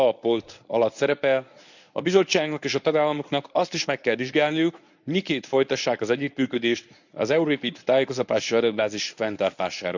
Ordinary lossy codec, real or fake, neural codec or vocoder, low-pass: none; fake; codec, 24 kHz, 0.9 kbps, WavTokenizer, medium speech release version 1; 7.2 kHz